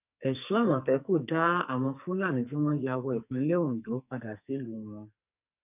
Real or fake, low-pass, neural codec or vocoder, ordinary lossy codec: fake; 3.6 kHz; codec, 44.1 kHz, 2.6 kbps, SNAC; none